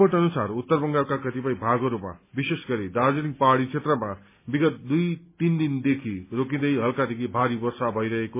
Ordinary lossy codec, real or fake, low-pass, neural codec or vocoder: MP3, 24 kbps; real; 3.6 kHz; none